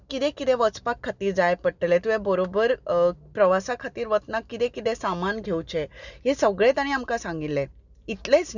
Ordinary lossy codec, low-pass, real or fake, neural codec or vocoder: none; 7.2 kHz; real; none